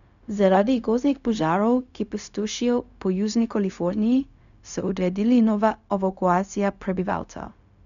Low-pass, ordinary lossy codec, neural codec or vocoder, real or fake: 7.2 kHz; none; codec, 16 kHz, 0.4 kbps, LongCat-Audio-Codec; fake